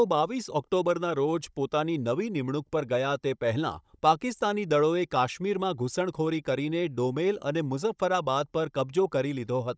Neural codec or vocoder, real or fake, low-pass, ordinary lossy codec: codec, 16 kHz, 16 kbps, FunCodec, trained on Chinese and English, 50 frames a second; fake; none; none